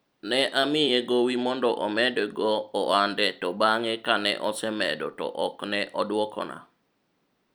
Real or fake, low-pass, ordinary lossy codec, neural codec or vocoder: fake; none; none; vocoder, 44.1 kHz, 128 mel bands every 256 samples, BigVGAN v2